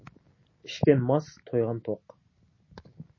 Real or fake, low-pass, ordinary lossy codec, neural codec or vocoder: real; 7.2 kHz; MP3, 32 kbps; none